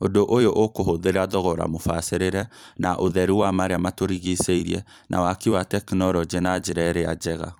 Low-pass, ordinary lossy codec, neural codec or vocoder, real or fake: none; none; vocoder, 44.1 kHz, 128 mel bands every 256 samples, BigVGAN v2; fake